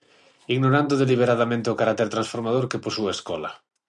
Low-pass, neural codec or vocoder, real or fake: 10.8 kHz; none; real